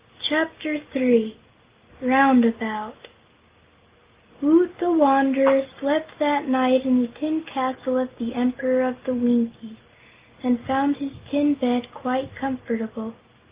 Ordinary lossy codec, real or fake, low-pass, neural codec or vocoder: Opus, 32 kbps; real; 3.6 kHz; none